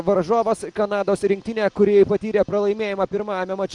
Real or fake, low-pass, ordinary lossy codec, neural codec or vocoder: real; 10.8 kHz; Opus, 32 kbps; none